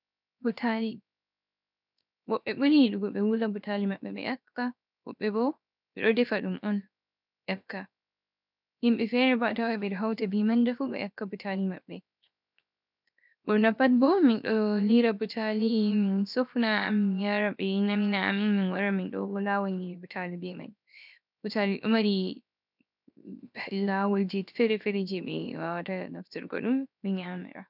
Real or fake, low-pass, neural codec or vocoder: fake; 5.4 kHz; codec, 16 kHz, 0.7 kbps, FocalCodec